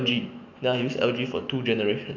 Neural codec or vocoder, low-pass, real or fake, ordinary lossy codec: autoencoder, 48 kHz, 128 numbers a frame, DAC-VAE, trained on Japanese speech; 7.2 kHz; fake; none